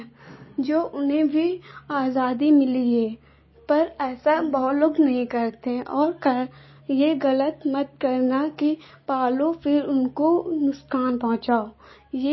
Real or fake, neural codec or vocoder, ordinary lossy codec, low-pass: real; none; MP3, 24 kbps; 7.2 kHz